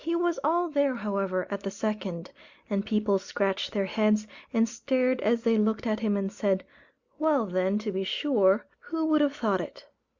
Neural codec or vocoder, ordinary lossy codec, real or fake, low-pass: none; Opus, 64 kbps; real; 7.2 kHz